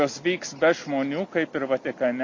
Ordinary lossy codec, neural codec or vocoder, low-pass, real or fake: MP3, 48 kbps; none; 7.2 kHz; real